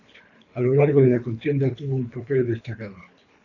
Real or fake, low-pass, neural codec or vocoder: fake; 7.2 kHz; codec, 24 kHz, 3 kbps, HILCodec